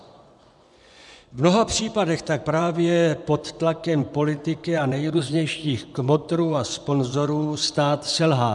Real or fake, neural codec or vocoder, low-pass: fake; vocoder, 24 kHz, 100 mel bands, Vocos; 10.8 kHz